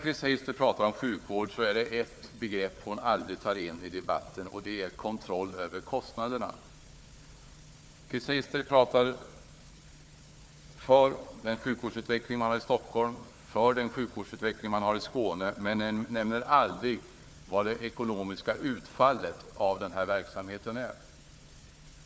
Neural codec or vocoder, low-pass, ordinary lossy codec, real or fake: codec, 16 kHz, 4 kbps, FunCodec, trained on Chinese and English, 50 frames a second; none; none; fake